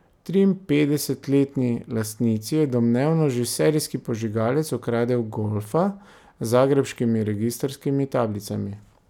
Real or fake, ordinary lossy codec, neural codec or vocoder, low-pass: real; none; none; 19.8 kHz